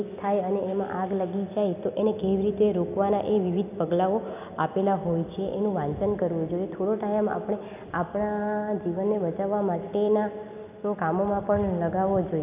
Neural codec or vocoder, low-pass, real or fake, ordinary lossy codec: none; 3.6 kHz; real; none